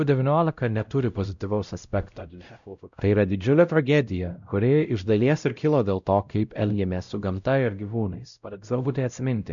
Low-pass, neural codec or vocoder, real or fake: 7.2 kHz; codec, 16 kHz, 0.5 kbps, X-Codec, WavLM features, trained on Multilingual LibriSpeech; fake